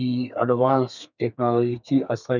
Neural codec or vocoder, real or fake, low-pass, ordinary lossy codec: codec, 32 kHz, 1.9 kbps, SNAC; fake; 7.2 kHz; none